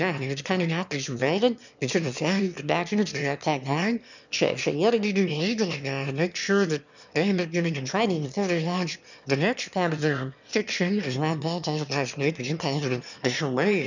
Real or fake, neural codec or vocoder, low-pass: fake; autoencoder, 22.05 kHz, a latent of 192 numbers a frame, VITS, trained on one speaker; 7.2 kHz